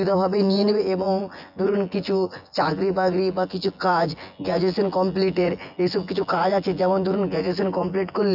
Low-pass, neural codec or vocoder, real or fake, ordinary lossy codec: 5.4 kHz; vocoder, 24 kHz, 100 mel bands, Vocos; fake; none